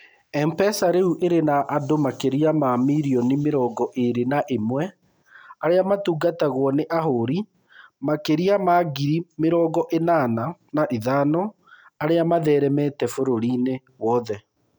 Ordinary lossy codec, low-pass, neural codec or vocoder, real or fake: none; none; none; real